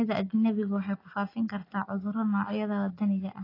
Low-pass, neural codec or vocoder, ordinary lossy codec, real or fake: 5.4 kHz; none; AAC, 24 kbps; real